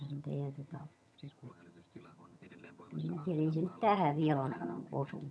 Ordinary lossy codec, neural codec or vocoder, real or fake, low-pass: none; vocoder, 22.05 kHz, 80 mel bands, HiFi-GAN; fake; none